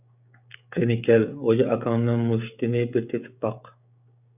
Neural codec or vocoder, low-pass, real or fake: codec, 16 kHz, 16 kbps, FreqCodec, smaller model; 3.6 kHz; fake